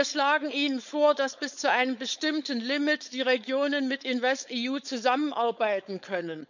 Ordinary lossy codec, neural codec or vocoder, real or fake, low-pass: none; codec, 16 kHz, 4.8 kbps, FACodec; fake; 7.2 kHz